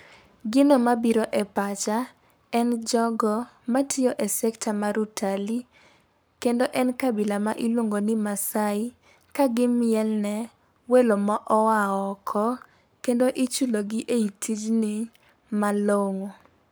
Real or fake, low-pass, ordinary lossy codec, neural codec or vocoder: fake; none; none; codec, 44.1 kHz, 7.8 kbps, Pupu-Codec